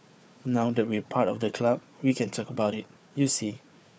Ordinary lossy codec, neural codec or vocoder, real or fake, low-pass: none; codec, 16 kHz, 4 kbps, FunCodec, trained on Chinese and English, 50 frames a second; fake; none